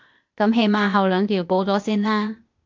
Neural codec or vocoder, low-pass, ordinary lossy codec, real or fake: codec, 16 kHz, 0.8 kbps, ZipCodec; 7.2 kHz; MP3, 64 kbps; fake